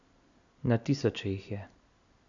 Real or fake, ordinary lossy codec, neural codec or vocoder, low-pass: real; none; none; 7.2 kHz